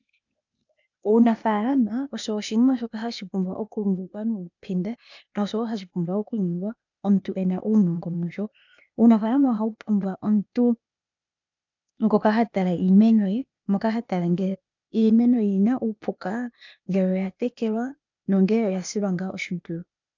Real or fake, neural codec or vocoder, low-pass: fake; codec, 16 kHz, 0.8 kbps, ZipCodec; 7.2 kHz